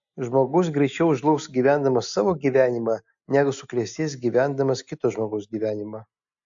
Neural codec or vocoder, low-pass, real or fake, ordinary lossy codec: none; 7.2 kHz; real; AAC, 64 kbps